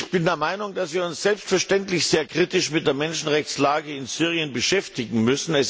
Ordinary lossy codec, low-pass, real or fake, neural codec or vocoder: none; none; real; none